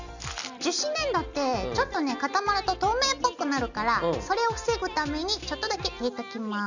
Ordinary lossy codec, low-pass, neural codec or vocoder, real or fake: none; 7.2 kHz; none; real